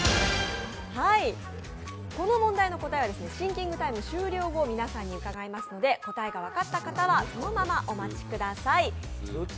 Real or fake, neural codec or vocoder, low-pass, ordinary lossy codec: real; none; none; none